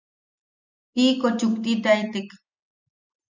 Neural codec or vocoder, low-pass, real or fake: none; 7.2 kHz; real